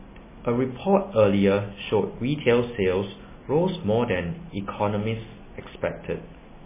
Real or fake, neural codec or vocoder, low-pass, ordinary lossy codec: real; none; 3.6 kHz; MP3, 16 kbps